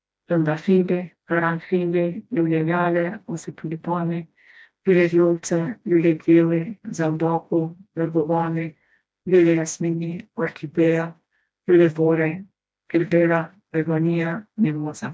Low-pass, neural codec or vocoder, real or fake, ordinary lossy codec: none; codec, 16 kHz, 1 kbps, FreqCodec, smaller model; fake; none